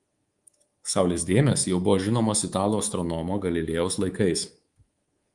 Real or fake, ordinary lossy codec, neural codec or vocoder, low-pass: fake; Opus, 24 kbps; codec, 24 kHz, 3.1 kbps, DualCodec; 10.8 kHz